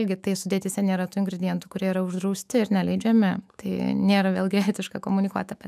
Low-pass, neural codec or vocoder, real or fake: 14.4 kHz; autoencoder, 48 kHz, 128 numbers a frame, DAC-VAE, trained on Japanese speech; fake